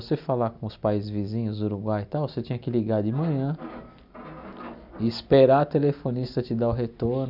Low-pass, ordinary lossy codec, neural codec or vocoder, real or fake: 5.4 kHz; none; none; real